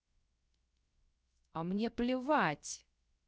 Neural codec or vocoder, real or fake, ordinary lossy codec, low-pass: codec, 16 kHz, 0.3 kbps, FocalCodec; fake; none; none